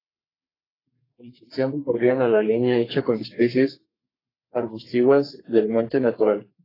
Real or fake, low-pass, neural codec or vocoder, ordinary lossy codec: fake; 5.4 kHz; codec, 32 kHz, 1.9 kbps, SNAC; AAC, 24 kbps